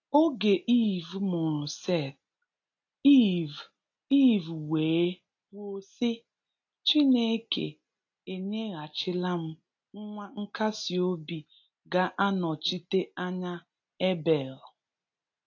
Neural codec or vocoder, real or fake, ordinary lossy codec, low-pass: none; real; AAC, 48 kbps; 7.2 kHz